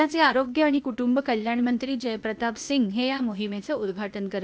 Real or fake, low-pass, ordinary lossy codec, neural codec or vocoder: fake; none; none; codec, 16 kHz, 0.8 kbps, ZipCodec